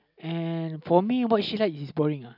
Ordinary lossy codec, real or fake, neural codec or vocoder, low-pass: none; real; none; 5.4 kHz